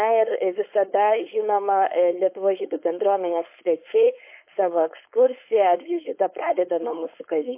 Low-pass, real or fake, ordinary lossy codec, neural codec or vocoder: 3.6 kHz; fake; MP3, 24 kbps; codec, 16 kHz, 4.8 kbps, FACodec